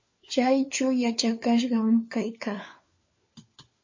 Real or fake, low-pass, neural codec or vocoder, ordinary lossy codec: fake; 7.2 kHz; codec, 16 kHz, 4 kbps, FunCodec, trained on LibriTTS, 50 frames a second; MP3, 32 kbps